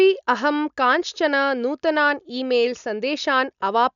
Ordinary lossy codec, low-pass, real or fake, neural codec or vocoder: none; 7.2 kHz; real; none